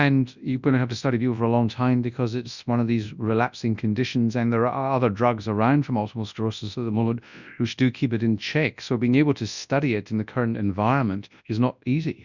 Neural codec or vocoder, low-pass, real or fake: codec, 24 kHz, 0.9 kbps, WavTokenizer, large speech release; 7.2 kHz; fake